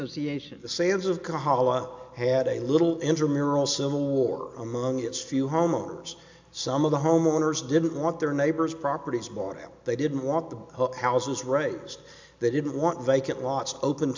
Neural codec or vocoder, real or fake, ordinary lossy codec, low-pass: none; real; MP3, 64 kbps; 7.2 kHz